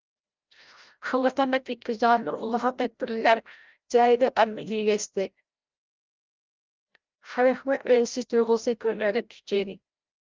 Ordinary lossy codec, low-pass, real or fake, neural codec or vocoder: Opus, 24 kbps; 7.2 kHz; fake; codec, 16 kHz, 0.5 kbps, FreqCodec, larger model